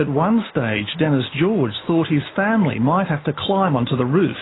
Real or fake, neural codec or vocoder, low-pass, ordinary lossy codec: real; none; 7.2 kHz; AAC, 16 kbps